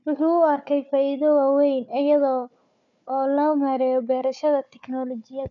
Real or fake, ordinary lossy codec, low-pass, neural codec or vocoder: fake; none; 7.2 kHz; codec, 16 kHz, 4 kbps, FunCodec, trained on Chinese and English, 50 frames a second